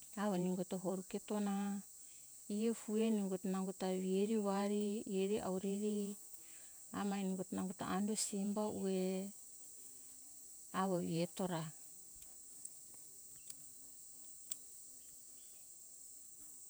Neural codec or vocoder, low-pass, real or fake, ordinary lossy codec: vocoder, 48 kHz, 128 mel bands, Vocos; none; fake; none